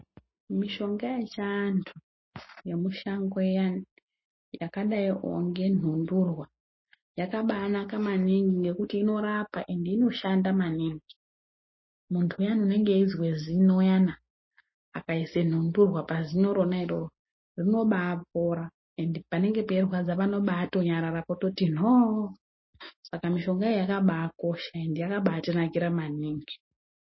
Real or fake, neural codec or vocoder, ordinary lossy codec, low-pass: real; none; MP3, 24 kbps; 7.2 kHz